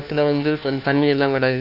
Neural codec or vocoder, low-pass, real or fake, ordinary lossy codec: codec, 16 kHz, 1 kbps, FunCodec, trained on LibriTTS, 50 frames a second; 5.4 kHz; fake; none